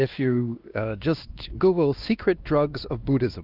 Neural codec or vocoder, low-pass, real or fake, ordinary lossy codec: codec, 16 kHz, 2 kbps, X-Codec, WavLM features, trained on Multilingual LibriSpeech; 5.4 kHz; fake; Opus, 24 kbps